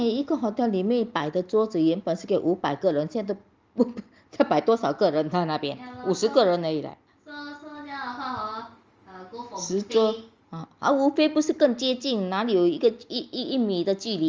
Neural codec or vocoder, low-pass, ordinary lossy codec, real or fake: none; 7.2 kHz; Opus, 32 kbps; real